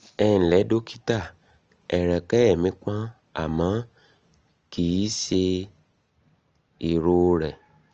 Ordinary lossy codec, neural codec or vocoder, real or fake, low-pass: Opus, 24 kbps; none; real; 7.2 kHz